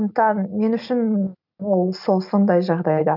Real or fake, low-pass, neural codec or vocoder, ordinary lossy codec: real; 5.4 kHz; none; none